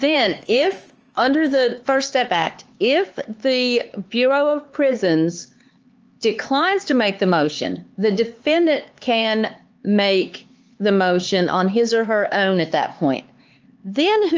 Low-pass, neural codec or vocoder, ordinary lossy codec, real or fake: 7.2 kHz; codec, 16 kHz, 4 kbps, X-Codec, HuBERT features, trained on LibriSpeech; Opus, 24 kbps; fake